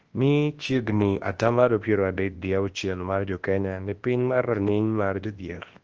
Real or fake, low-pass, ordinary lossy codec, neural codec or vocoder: fake; 7.2 kHz; Opus, 16 kbps; codec, 24 kHz, 0.9 kbps, WavTokenizer, large speech release